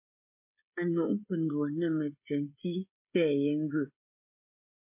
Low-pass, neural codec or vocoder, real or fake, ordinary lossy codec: 3.6 kHz; codec, 16 kHz, 16 kbps, FreqCodec, smaller model; fake; MP3, 24 kbps